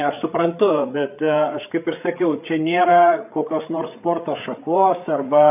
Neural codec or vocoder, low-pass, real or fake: codec, 16 kHz, 8 kbps, FreqCodec, larger model; 3.6 kHz; fake